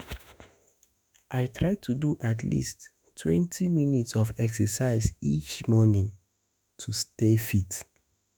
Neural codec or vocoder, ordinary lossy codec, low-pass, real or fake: autoencoder, 48 kHz, 32 numbers a frame, DAC-VAE, trained on Japanese speech; none; none; fake